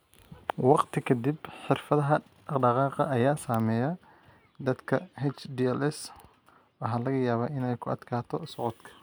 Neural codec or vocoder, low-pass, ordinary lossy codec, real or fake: none; none; none; real